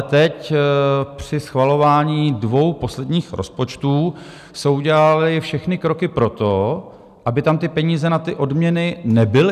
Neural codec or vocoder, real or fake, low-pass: none; real; 14.4 kHz